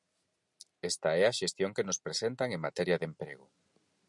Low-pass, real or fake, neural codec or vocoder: 10.8 kHz; real; none